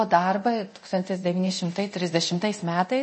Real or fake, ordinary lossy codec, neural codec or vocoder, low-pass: fake; MP3, 32 kbps; codec, 24 kHz, 0.9 kbps, DualCodec; 10.8 kHz